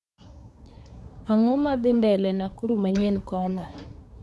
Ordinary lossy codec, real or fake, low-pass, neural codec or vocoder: none; fake; none; codec, 24 kHz, 1 kbps, SNAC